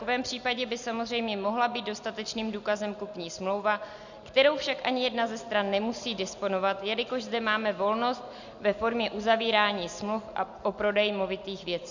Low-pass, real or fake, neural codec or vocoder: 7.2 kHz; real; none